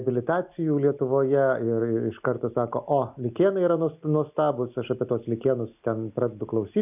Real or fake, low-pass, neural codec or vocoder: real; 3.6 kHz; none